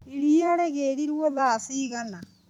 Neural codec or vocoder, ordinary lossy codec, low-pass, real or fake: vocoder, 44.1 kHz, 128 mel bands every 256 samples, BigVGAN v2; none; 19.8 kHz; fake